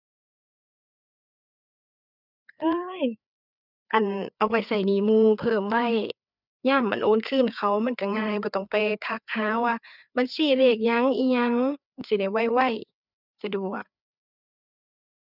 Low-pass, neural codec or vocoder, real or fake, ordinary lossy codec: 5.4 kHz; codec, 16 kHz, 4 kbps, FreqCodec, larger model; fake; none